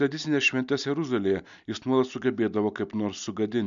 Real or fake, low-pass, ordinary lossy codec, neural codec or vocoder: real; 7.2 kHz; MP3, 96 kbps; none